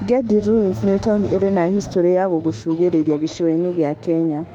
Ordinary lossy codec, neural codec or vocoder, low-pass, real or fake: none; autoencoder, 48 kHz, 32 numbers a frame, DAC-VAE, trained on Japanese speech; 19.8 kHz; fake